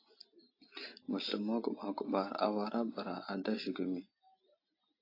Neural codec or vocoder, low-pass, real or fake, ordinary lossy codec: none; 5.4 kHz; real; AAC, 32 kbps